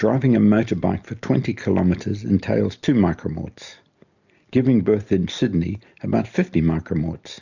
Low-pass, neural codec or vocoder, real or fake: 7.2 kHz; none; real